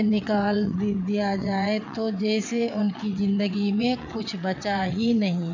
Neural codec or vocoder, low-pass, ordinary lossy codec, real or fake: vocoder, 22.05 kHz, 80 mel bands, WaveNeXt; 7.2 kHz; none; fake